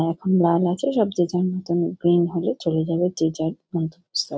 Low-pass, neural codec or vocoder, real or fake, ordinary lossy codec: none; none; real; none